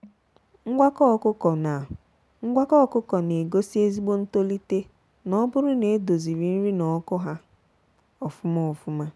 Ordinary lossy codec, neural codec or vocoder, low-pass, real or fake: none; none; none; real